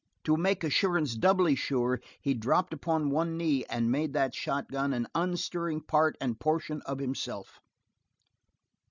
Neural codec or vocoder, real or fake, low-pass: none; real; 7.2 kHz